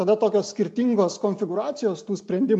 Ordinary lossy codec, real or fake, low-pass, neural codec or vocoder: AAC, 64 kbps; real; 10.8 kHz; none